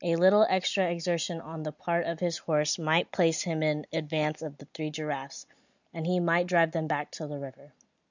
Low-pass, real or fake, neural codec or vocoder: 7.2 kHz; real; none